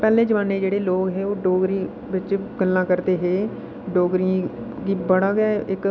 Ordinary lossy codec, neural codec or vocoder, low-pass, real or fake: none; none; none; real